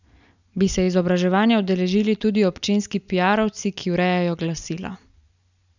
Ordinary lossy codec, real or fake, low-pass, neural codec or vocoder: none; real; 7.2 kHz; none